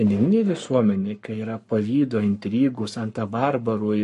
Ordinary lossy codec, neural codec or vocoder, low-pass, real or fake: MP3, 48 kbps; codec, 44.1 kHz, 7.8 kbps, Pupu-Codec; 14.4 kHz; fake